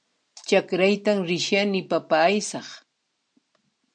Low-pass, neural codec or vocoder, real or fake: 9.9 kHz; none; real